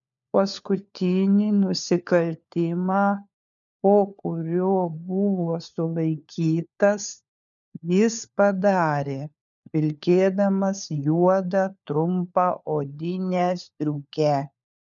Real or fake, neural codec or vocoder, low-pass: fake; codec, 16 kHz, 4 kbps, FunCodec, trained on LibriTTS, 50 frames a second; 7.2 kHz